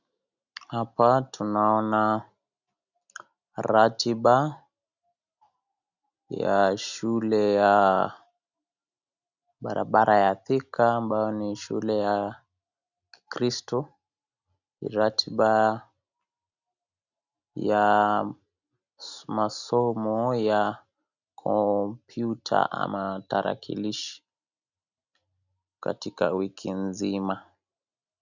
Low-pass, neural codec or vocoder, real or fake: 7.2 kHz; none; real